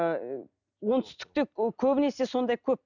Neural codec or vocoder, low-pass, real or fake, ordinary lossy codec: none; 7.2 kHz; real; none